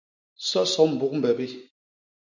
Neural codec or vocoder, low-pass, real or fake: none; 7.2 kHz; real